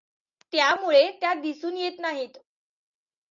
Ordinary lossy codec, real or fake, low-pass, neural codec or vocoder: AAC, 64 kbps; real; 7.2 kHz; none